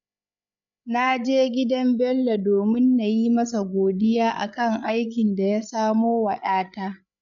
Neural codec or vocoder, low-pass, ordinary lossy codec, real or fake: codec, 16 kHz, 8 kbps, FreqCodec, larger model; 7.2 kHz; none; fake